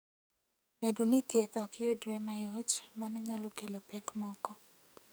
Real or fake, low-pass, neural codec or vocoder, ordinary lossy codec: fake; none; codec, 44.1 kHz, 2.6 kbps, SNAC; none